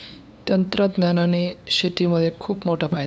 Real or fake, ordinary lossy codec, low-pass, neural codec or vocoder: fake; none; none; codec, 16 kHz, 2 kbps, FunCodec, trained on LibriTTS, 25 frames a second